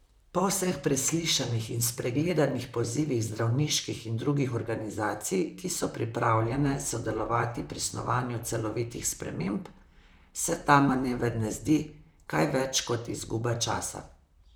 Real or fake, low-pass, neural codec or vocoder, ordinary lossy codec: fake; none; vocoder, 44.1 kHz, 128 mel bands, Pupu-Vocoder; none